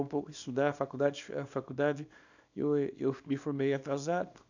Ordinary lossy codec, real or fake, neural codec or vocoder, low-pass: none; fake; codec, 24 kHz, 0.9 kbps, WavTokenizer, small release; 7.2 kHz